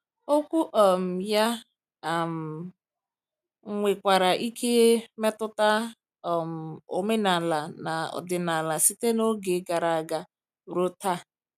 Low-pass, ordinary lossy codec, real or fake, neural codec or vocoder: 14.4 kHz; none; real; none